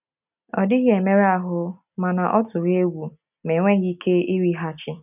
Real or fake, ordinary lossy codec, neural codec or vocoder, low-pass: real; none; none; 3.6 kHz